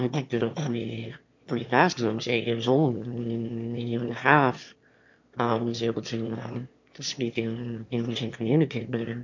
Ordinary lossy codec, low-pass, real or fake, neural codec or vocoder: MP3, 48 kbps; 7.2 kHz; fake; autoencoder, 22.05 kHz, a latent of 192 numbers a frame, VITS, trained on one speaker